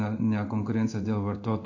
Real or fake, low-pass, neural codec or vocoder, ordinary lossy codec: fake; 7.2 kHz; codec, 16 kHz in and 24 kHz out, 1 kbps, XY-Tokenizer; AAC, 48 kbps